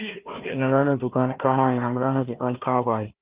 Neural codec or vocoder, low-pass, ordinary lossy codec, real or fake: codec, 16 kHz, 1 kbps, FunCodec, trained on Chinese and English, 50 frames a second; 3.6 kHz; Opus, 32 kbps; fake